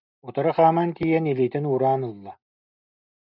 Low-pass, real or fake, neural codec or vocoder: 5.4 kHz; real; none